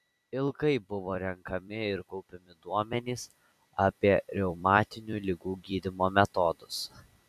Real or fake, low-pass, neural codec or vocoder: fake; 14.4 kHz; vocoder, 44.1 kHz, 128 mel bands every 256 samples, BigVGAN v2